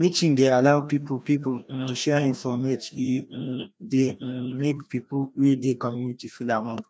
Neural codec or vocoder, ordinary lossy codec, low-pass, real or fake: codec, 16 kHz, 1 kbps, FreqCodec, larger model; none; none; fake